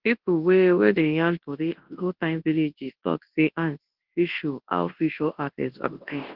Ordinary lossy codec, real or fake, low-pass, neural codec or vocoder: Opus, 16 kbps; fake; 5.4 kHz; codec, 24 kHz, 0.9 kbps, WavTokenizer, large speech release